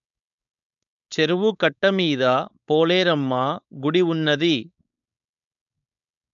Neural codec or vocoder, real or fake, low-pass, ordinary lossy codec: codec, 16 kHz, 4.8 kbps, FACodec; fake; 7.2 kHz; none